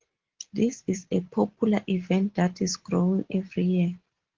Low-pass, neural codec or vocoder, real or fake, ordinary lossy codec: 7.2 kHz; none; real; Opus, 16 kbps